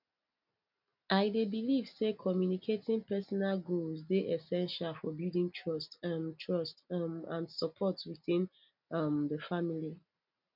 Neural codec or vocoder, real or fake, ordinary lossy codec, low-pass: none; real; none; 5.4 kHz